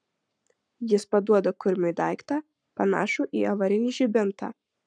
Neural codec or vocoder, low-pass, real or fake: codec, 44.1 kHz, 7.8 kbps, Pupu-Codec; 9.9 kHz; fake